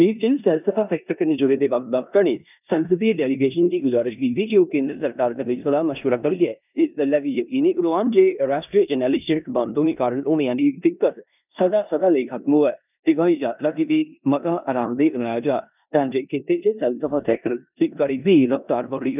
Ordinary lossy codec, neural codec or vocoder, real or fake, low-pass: none; codec, 16 kHz in and 24 kHz out, 0.9 kbps, LongCat-Audio-Codec, four codebook decoder; fake; 3.6 kHz